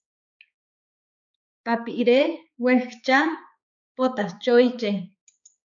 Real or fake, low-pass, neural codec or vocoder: fake; 7.2 kHz; codec, 16 kHz, 4 kbps, X-Codec, HuBERT features, trained on balanced general audio